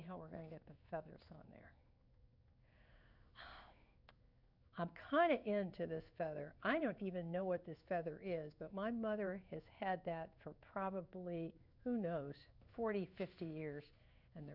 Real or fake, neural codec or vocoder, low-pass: fake; codec, 16 kHz in and 24 kHz out, 1 kbps, XY-Tokenizer; 5.4 kHz